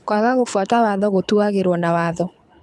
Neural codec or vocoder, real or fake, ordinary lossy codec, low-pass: codec, 24 kHz, 6 kbps, HILCodec; fake; none; none